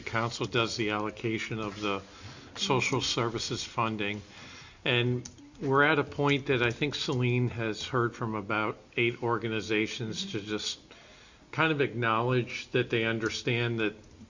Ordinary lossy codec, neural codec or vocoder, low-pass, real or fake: Opus, 64 kbps; none; 7.2 kHz; real